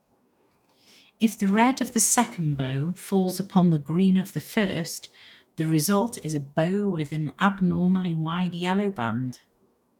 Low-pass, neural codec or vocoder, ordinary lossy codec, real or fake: 19.8 kHz; codec, 44.1 kHz, 2.6 kbps, DAC; none; fake